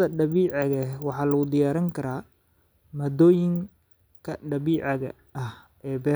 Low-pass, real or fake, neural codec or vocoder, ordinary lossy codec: none; real; none; none